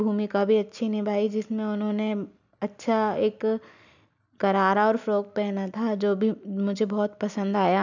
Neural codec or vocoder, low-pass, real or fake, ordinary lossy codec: none; 7.2 kHz; real; none